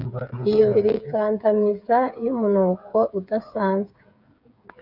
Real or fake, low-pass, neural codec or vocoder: fake; 5.4 kHz; codec, 16 kHz, 8 kbps, FreqCodec, smaller model